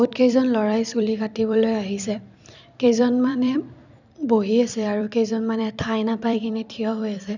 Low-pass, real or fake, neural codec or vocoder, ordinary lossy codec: 7.2 kHz; fake; vocoder, 44.1 kHz, 128 mel bands every 256 samples, BigVGAN v2; none